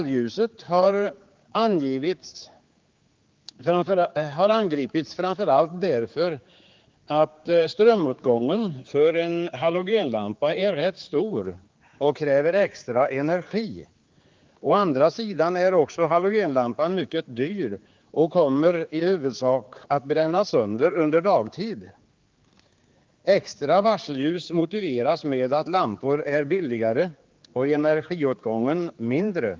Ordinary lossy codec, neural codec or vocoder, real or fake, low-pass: Opus, 32 kbps; codec, 16 kHz, 4 kbps, X-Codec, HuBERT features, trained on general audio; fake; 7.2 kHz